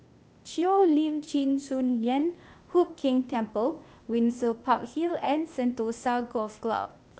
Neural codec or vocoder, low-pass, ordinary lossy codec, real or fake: codec, 16 kHz, 0.8 kbps, ZipCodec; none; none; fake